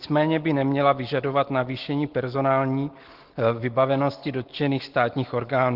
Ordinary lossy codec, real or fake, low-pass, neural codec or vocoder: Opus, 16 kbps; real; 5.4 kHz; none